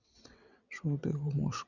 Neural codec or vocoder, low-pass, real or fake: vocoder, 22.05 kHz, 80 mel bands, WaveNeXt; 7.2 kHz; fake